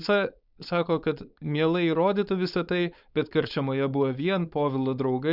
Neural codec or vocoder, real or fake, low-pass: codec, 16 kHz, 4.8 kbps, FACodec; fake; 5.4 kHz